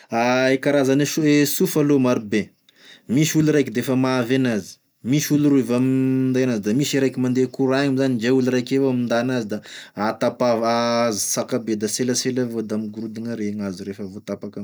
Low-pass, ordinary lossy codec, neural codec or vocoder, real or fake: none; none; none; real